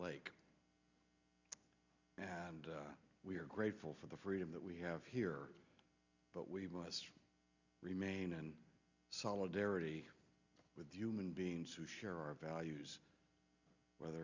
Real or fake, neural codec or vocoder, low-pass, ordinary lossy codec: real; none; 7.2 kHz; Opus, 64 kbps